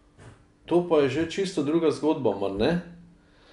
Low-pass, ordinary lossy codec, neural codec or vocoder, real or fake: 10.8 kHz; none; none; real